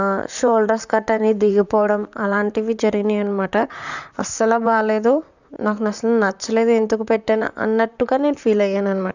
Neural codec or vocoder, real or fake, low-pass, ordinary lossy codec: vocoder, 44.1 kHz, 128 mel bands, Pupu-Vocoder; fake; 7.2 kHz; none